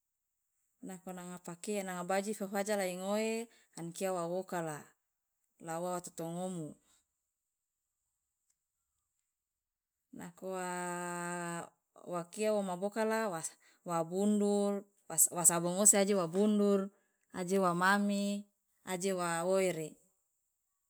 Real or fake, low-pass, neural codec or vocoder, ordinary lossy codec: real; none; none; none